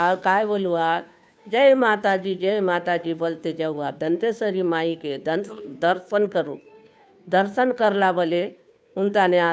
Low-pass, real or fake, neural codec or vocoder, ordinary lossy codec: none; fake; codec, 16 kHz, 2 kbps, FunCodec, trained on Chinese and English, 25 frames a second; none